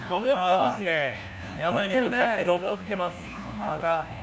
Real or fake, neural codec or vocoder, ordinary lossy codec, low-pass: fake; codec, 16 kHz, 1 kbps, FunCodec, trained on LibriTTS, 50 frames a second; none; none